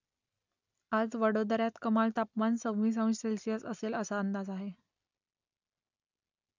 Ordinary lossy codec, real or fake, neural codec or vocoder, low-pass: none; real; none; 7.2 kHz